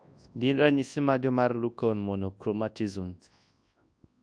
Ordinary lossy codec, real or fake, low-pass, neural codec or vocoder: AAC, 64 kbps; fake; 9.9 kHz; codec, 24 kHz, 0.9 kbps, WavTokenizer, large speech release